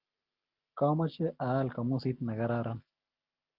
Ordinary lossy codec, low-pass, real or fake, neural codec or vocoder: Opus, 32 kbps; 5.4 kHz; real; none